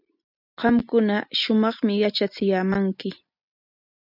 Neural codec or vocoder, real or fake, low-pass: none; real; 5.4 kHz